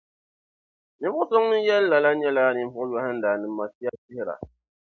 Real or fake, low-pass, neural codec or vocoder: real; 7.2 kHz; none